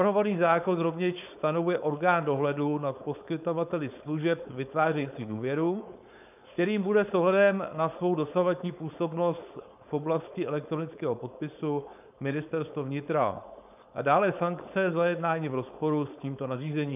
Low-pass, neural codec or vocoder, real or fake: 3.6 kHz; codec, 16 kHz, 4.8 kbps, FACodec; fake